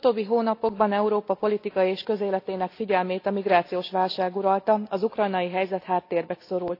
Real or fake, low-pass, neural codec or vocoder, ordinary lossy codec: real; 5.4 kHz; none; AAC, 32 kbps